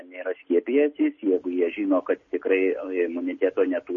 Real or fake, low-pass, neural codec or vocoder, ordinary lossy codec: real; 7.2 kHz; none; MP3, 32 kbps